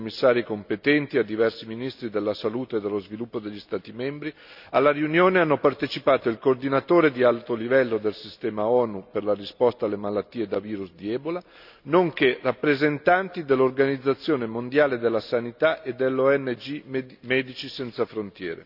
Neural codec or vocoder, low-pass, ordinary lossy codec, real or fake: none; 5.4 kHz; none; real